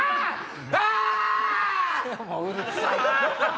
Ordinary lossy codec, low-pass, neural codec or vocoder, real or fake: none; none; none; real